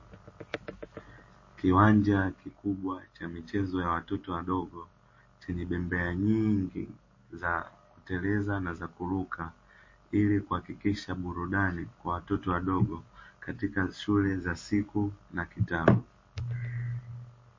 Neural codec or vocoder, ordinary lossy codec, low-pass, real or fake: none; MP3, 32 kbps; 7.2 kHz; real